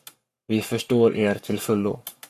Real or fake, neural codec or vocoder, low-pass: fake; codec, 44.1 kHz, 7.8 kbps, Pupu-Codec; 14.4 kHz